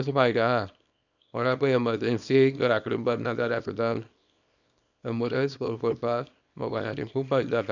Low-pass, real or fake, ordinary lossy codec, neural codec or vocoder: 7.2 kHz; fake; none; codec, 24 kHz, 0.9 kbps, WavTokenizer, small release